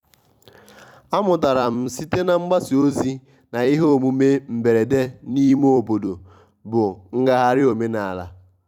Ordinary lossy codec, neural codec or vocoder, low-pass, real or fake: none; vocoder, 44.1 kHz, 128 mel bands every 256 samples, BigVGAN v2; 19.8 kHz; fake